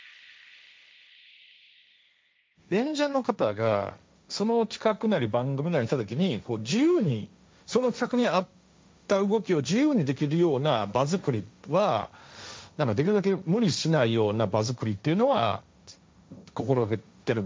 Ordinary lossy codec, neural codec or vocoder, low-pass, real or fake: none; codec, 16 kHz, 1.1 kbps, Voila-Tokenizer; none; fake